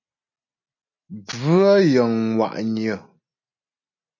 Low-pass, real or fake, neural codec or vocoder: 7.2 kHz; real; none